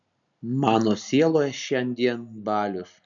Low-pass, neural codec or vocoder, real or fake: 7.2 kHz; none; real